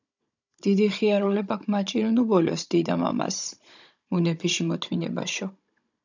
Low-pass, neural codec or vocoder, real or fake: 7.2 kHz; codec, 16 kHz, 16 kbps, FunCodec, trained on Chinese and English, 50 frames a second; fake